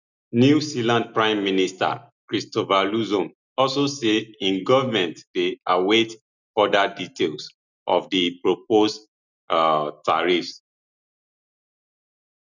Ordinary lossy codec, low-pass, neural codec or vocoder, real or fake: none; 7.2 kHz; none; real